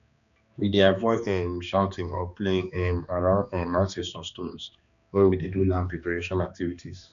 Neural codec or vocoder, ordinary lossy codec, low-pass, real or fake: codec, 16 kHz, 2 kbps, X-Codec, HuBERT features, trained on balanced general audio; none; 7.2 kHz; fake